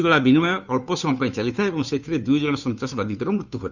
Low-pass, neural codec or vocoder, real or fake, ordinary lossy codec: 7.2 kHz; codec, 16 kHz, 2 kbps, FunCodec, trained on Chinese and English, 25 frames a second; fake; none